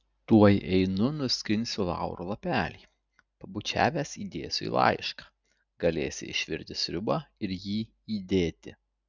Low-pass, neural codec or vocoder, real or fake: 7.2 kHz; none; real